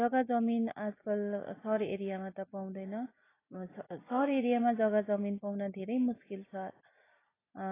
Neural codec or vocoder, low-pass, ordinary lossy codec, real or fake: none; 3.6 kHz; AAC, 16 kbps; real